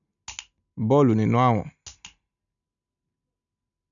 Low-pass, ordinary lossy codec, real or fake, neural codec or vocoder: 7.2 kHz; none; real; none